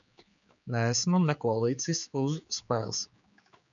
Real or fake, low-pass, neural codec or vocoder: fake; 7.2 kHz; codec, 16 kHz, 4 kbps, X-Codec, HuBERT features, trained on general audio